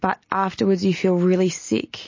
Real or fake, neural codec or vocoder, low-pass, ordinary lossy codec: real; none; 7.2 kHz; MP3, 32 kbps